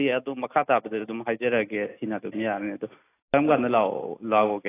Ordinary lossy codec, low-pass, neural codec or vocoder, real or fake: AAC, 24 kbps; 3.6 kHz; none; real